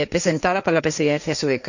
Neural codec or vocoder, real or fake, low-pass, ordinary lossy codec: codec, 16 kHz, 1.1 kbps, Voila-Tokenizer; fake; 7.2 kHz; none